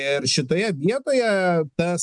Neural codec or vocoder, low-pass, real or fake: none; 10.8 kHz; real